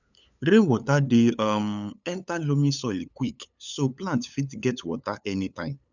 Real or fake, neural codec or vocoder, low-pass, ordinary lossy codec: fake; codec, 16 kHz, 8 kbps, FunCodec, trained on LibriTTS, 25 frames a second; 7.2 kHz; none